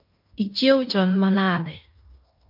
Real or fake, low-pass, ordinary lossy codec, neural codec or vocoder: fake; 5.4 kHz; MP3, 48 kbps; codec, 16 kHz in and 24 kHz out, 0.9 kbps, LongCat-Audio-Codec, fine tuned four codebook decoder